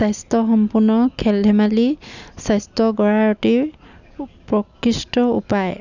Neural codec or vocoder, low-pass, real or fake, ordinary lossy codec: none; 7.2 kHz; real; none